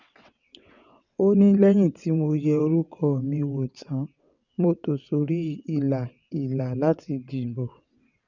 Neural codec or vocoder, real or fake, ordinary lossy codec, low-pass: vocoder, 22.05 kHz, 80 mel bands, WaveNeXt; fake; none; 7.2 kHz